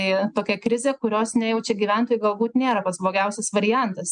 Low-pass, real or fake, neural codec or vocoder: 9.9 kHz; real; none